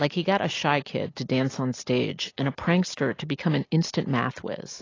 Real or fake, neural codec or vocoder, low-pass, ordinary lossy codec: real; none; 7.2 kHz; AAC, 32 kbps